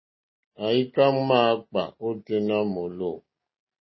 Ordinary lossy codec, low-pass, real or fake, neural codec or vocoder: MP3, 24 kbps; 7.2 kHz; real; none